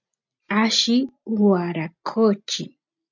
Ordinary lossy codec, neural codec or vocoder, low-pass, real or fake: MP3, 64 kbps; none; 7.2 kHz; real